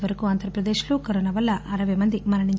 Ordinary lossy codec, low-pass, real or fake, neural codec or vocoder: none; none; real; none